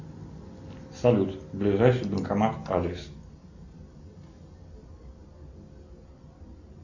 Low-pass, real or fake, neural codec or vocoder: 7.2 kHz; real; none